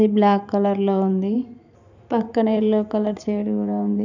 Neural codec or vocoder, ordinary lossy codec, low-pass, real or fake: vocoder, 44.1 kHz, 128 mel bands every 512 samples, BigVGAN v2; none; 7.2 kHz; fake